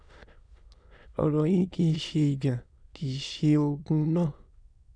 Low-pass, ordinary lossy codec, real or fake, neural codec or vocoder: 9.9 kHz; none; fake; autoencoder, 22.05 kHz, a latent of 192 numbers a frame, VITS, trained on many speakers